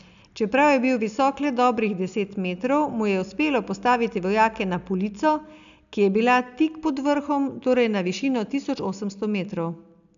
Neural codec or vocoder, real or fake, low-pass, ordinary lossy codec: none; real; 7.2 kHz; MP3, 96 kbps